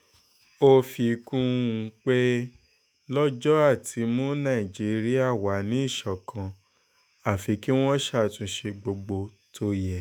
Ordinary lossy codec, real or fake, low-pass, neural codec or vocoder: none; fake; none; autoencoder, 48 kHz, 128 numbers a frame, DAC-VAE, trained on Japanese speech